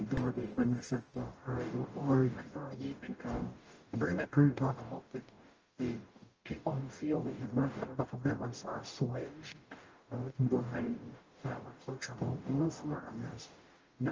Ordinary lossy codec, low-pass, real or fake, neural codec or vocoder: Opus, 24 kbps; 7.2 kHz; fake; codec, 44.1 kHz, 0.9 kbps, DAC